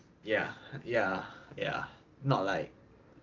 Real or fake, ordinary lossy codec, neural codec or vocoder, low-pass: real; Opus, 24 kbps; none; 7.2 kHz